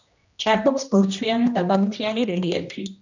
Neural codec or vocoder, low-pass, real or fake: codec, 16 kHz, 1 kbps, X-Codec, HuBERT features, trained on general audio; 7.2 kHz; fake